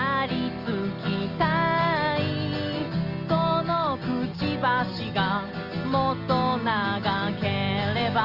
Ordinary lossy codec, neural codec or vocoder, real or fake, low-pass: Opus, 24 kbps; none; real; 5.4 kHz